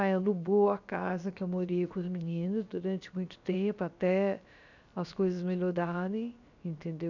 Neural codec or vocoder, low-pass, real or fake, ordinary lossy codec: codec, 16 kHz, about 1 kbps, DyCAST, with the encoder's durations; 7.2 kHz; fake; none